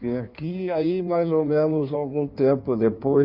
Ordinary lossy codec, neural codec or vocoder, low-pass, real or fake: Opus, 64 kbps; codec, 16 kHz in and 24 kHz out, 1.1 kbps, FireRedTTS-2 codec; 5.4 kHz; fake